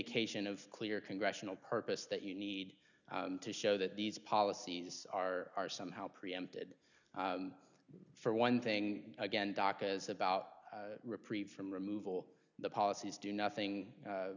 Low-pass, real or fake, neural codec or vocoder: 7.2 kHz; real; none